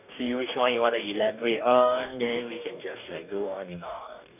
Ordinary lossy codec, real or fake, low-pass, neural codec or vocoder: none; fake; 3.6 kHz; codec, 44.1 kHz, 2.6 kbps, DAC